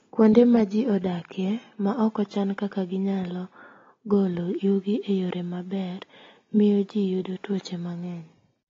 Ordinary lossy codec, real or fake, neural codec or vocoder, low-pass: AAC, 24 kbps; real; none; 7.2 kHz